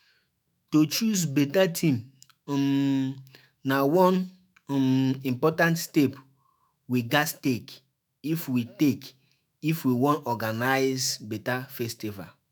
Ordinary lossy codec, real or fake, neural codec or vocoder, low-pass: none; fake; autoencoder, 48 kHz, 128 numbers a frame, DAC-VAE, trained on Japanese speech; none